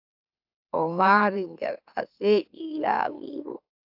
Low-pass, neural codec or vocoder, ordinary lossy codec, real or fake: 5.4 kHz; autoencoder, 44.1 kHz, a latent of 192 numbers a frame, MeloTTS; AAC, 48 kbps; fake